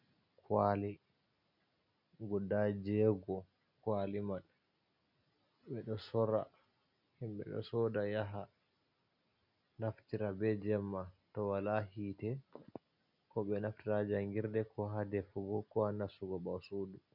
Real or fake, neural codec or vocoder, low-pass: real; none; 5.4 kHz